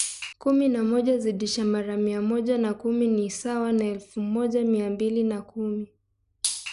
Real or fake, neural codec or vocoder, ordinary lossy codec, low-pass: real; none; none; 10.8 kHz